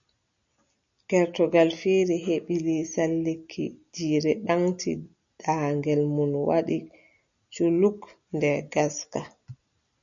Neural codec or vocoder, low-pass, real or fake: none; 7.2 kHz; real